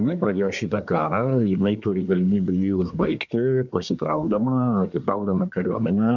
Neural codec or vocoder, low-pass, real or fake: codec, 24 kHz, 1 kbps, SNAC; 7.2 kHz; fake